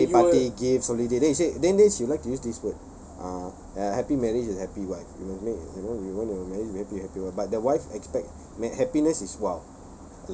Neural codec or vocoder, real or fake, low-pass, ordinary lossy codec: none; real; none; none